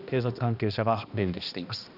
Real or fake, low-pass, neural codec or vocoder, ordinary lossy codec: fake; 5.4 kHz; codec, 16 kHz, 1 kbps, X-Codec, HuBERT features, trained on balanced general audio; none